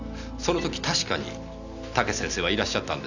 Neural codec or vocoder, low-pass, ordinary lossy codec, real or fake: none; 7.2 kHz; none; real